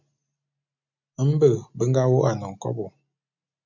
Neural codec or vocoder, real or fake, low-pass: none; real; 7.2 kHz